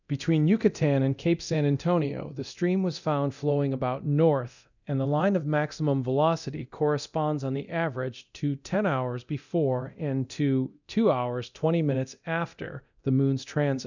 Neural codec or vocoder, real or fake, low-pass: codec, 24 kHz, 0.9 kbps, DualCodec; fake; 7.2 kHz